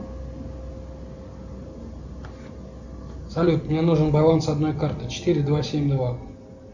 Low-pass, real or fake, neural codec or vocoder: 7.2 kHz; real; none